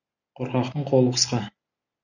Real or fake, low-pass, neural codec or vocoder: real; 7.2 kHz; none